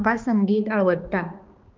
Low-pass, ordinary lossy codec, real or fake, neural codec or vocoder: 7.2 kHz; Opus, 32 kbps; fake; codec, 16 kHz, 2 kbps, X-Codec, HuBERT features, trained on balanced general audio